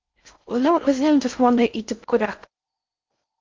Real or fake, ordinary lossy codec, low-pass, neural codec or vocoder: fake; Opus, 32 kbps; 7.2 kHz; codec, 16 kHz in and 24 kHz out, 0.6 kbps, FocalCodec, streaming, 4096 codes